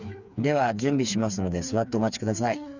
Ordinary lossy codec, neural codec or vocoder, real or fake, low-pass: none; codec, 16 kHz, 4 kbps, FreqCodec, smaller model; fake; 7.2 kHz